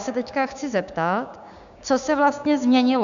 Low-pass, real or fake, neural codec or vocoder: 7.2 kHz; fake; codec, 16 kHz, 6 kbps, DAC